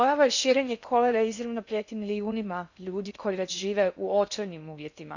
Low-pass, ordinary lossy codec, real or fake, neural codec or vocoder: 7.2 kHz; none; fake; codec, 16 kHz in and 24 kHz out, 0.6 kbps, FocalCodec, streaming, 2048 codes